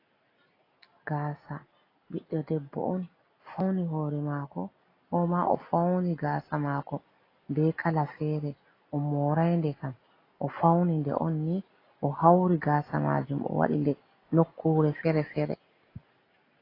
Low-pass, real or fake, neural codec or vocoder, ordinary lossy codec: 5.4 kHz; real; none; AAC, 24 kbps